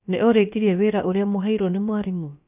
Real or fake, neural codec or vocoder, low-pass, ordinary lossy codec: fake; codec, 16 kHz, about 1 kbps, DyCAST, with the encoder's durations; 3.6 kHz; none